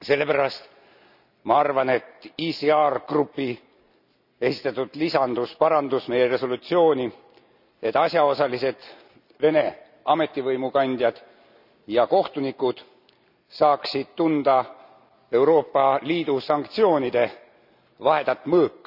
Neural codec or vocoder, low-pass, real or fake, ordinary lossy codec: none; 5.4 kHz; real; none